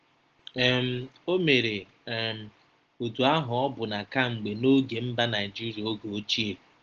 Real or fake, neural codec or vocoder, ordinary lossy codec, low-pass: real; none; Opus, 16 kbps; 7.2 kHz